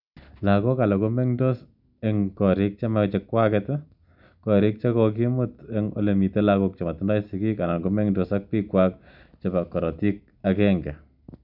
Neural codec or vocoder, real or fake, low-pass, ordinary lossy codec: none; real; 5.4 kHz; none